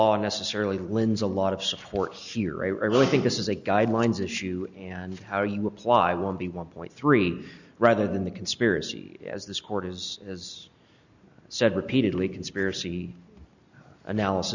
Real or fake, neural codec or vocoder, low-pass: real; none; 7.2 kHz